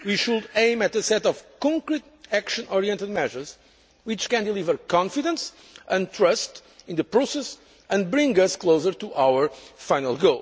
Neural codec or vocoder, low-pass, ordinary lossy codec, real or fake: none; none; none; real